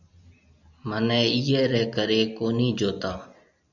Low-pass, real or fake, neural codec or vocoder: 7.2 kHz; real; none